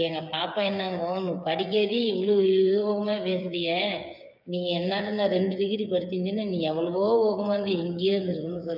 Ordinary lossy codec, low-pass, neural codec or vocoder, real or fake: none; 5.4 kHz; codec, 16 kHz, 8 kbps, FreqCodec, smaller model; fake